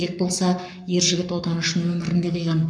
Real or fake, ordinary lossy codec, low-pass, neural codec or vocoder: fake; none; 9.9 kHz; codec, 44.1 kHz, 7.8 kbps, Pupu-Codec